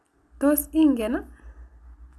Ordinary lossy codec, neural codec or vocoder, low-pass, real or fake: none; none; none; real